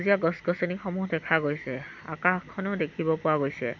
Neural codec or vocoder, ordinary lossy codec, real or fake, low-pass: none; none; real; 7.2 kHz